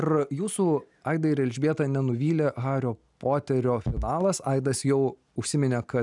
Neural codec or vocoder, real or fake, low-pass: none; real; 10.8 kHz